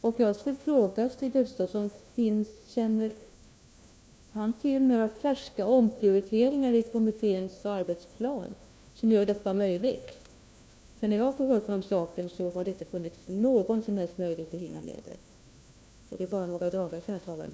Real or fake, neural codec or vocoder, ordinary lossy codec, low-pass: fake; codec, 16 kHz, 1 kbps, FunCodec, trained on LibriTTS, 50 frames a second; none; none